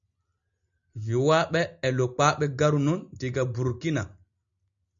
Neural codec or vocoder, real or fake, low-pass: none; real; 7.2 kHz